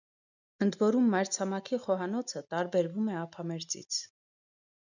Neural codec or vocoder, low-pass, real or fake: none; 7.2 kHz; real